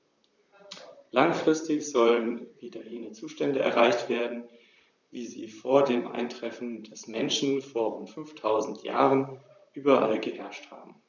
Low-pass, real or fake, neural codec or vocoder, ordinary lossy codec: 7.2 kHz; fake; vocoder, 44.1 kHz, 128 mel bands, Pupu-Vocoder; none